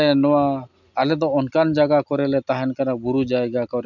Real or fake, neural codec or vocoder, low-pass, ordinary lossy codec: real; none; 7.2 kHz; none